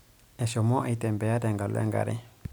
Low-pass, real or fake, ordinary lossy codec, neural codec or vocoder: none; real; none; none